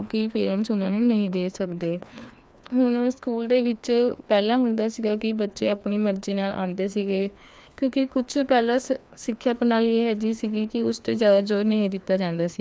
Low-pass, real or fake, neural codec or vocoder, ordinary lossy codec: none; fake; codec, 16 kHz, 2 kbps, FreqCodec, larger model; none